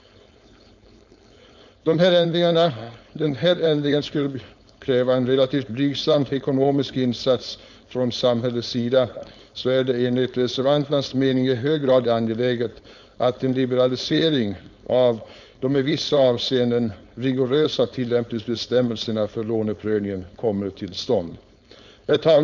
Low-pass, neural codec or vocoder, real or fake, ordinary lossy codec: 7.2 kHz; codec, 16 kHz, 4.8 kbps, FACodec; fake; none